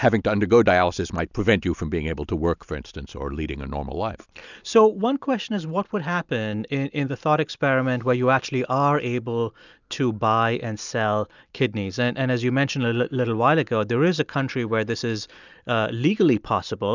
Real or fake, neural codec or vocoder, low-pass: real; none; 7.2 kHz